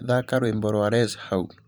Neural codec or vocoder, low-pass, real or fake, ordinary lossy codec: vocoder, 44.1 kHz, 128 mel bands every 512 samples, BigVGAN v2; none; fake; none